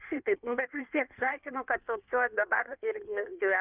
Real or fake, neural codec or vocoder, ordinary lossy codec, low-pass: fake; codec, 16 kHz in and 24 kHz out, 1.1 kbps, FireRedTTS-2 codec; Opus, 64 kbps; 3.6 kHz